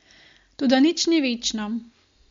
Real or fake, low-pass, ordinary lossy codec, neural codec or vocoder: real; 7.2 kHz; MP3, 48 kbps; none